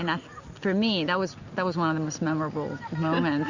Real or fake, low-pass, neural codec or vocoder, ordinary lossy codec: real; 7.2 kHz; none; Opus, 64 kbps